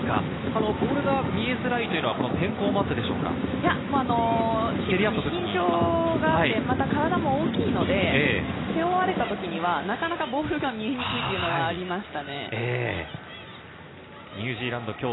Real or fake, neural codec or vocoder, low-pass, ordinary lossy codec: real; none; 7.2 kHz; AAC, 16 kbps